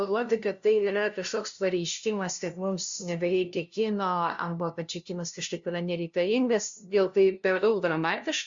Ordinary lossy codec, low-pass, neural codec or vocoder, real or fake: Opus, 64 kbps; 7.2 kHz; codec, 16 kHz, 0.5 kbps, FunCodec, trained on LibriTTS, 25 frames a second; fake